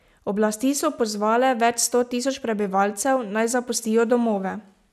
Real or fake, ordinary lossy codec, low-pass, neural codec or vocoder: real; none; 14.4 kHz; none